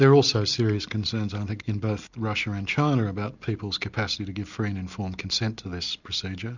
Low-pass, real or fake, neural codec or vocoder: 7.2 kHz; real; none